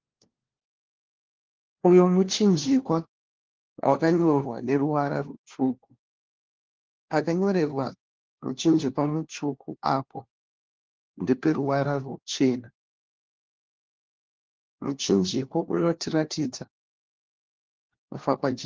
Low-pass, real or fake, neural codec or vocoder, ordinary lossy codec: 7.2 kHz; fake; codec, 16 kHz, 1 kbps, FunCodec, trained on LibriTTS, 50 frames a second; Opus, 16 kbps